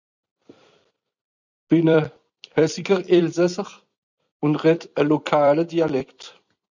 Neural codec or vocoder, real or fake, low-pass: vocoder, 44.1 kHz, 128 mel bands every 256 samples, BigVGAN v2; fake; 7.2 kHz